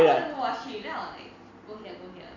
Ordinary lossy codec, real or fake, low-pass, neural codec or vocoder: none; real; 7.2 kHz; none